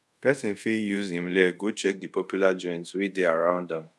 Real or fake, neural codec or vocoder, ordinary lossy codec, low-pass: fake; codec, 24 kHz, 0.5 kbps, DualCodec; none; none